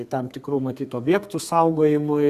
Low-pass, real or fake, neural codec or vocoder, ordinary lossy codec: 14.4 kHz; fake; codec, 32 kHz, 1.9 kbps, SNAC; Opus, 64 kbps